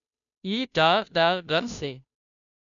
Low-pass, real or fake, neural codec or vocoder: 7.2 kHz; fake; codec, 16 kHz, 0.5 kbps, FunCodec, trained on Chinese and English, 25 frames a second